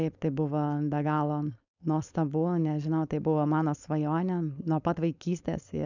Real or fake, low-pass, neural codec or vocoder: fake; 7.2 kHz; codec, 16 kHz, 4.8 kbps, FACodec